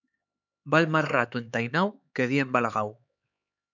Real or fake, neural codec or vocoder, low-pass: fake; codec, 16 kHz, 4 kbps, X-Codec, HuBERT features, trained on LibriSpeech; 7.2 kHz